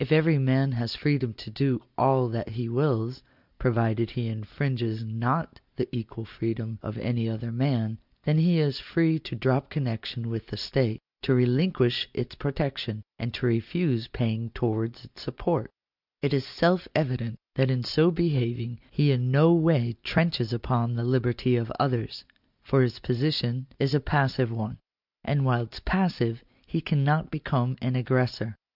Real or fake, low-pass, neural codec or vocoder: real; 5.4 kHz; none